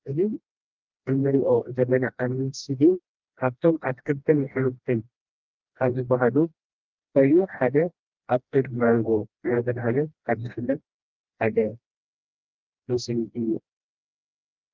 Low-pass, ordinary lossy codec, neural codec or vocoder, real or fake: 7.2 kHz; Opus, 32 kbps; codec, 16 kHz, 1 kbps, FreqCodec, smaller model; fake